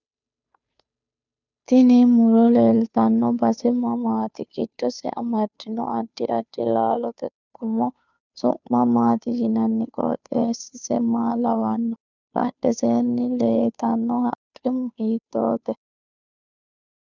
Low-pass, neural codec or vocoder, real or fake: 7.2 kHz; codec, 16 kHz, 2 kbps, FunCodec, trained on Chinese and English, 25 frames a second; fake